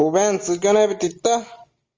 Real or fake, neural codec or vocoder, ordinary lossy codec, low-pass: real; none; Opus, 32 kbps; 7.2 kHz